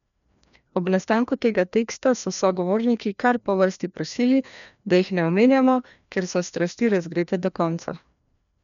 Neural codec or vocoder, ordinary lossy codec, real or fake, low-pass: codec, 16 kHz, 1 kbps, FreqCodec, larger model; none; fake; 7.2 kHz